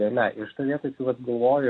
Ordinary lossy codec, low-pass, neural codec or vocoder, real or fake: AAC, 32 kbps; 9.9 kHz; none; real